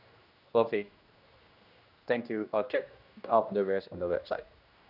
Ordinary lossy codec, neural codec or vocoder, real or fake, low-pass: none; codec, 16 kHz, 1 kbps, X-Codec, HuBERT features, trained on general audio; fake; 5.4 kHz